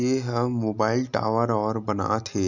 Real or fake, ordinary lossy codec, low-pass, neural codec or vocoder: real; none; 7.2 kHz; none